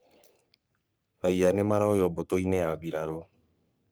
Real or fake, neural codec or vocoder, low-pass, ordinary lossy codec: fake; codec, 44.1 kHz, 3.4 kbps, Pupu-Codec; none; none